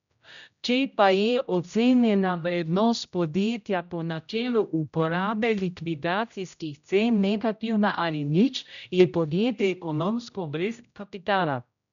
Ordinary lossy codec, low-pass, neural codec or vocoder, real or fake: none; 7.2 kHz; codec, 16 kHz, 0.5 kbps, X-Codec, HuBERT features, trained on general audio; fake